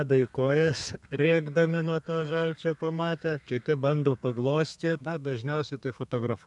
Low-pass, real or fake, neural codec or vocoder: 10.8 kHz; fake; codec, 32 kHz, 1.9 kbps, SNAC